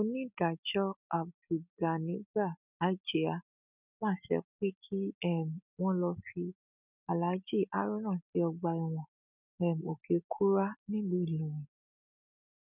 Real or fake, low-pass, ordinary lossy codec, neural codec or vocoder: real; 3.6 kHz; none; none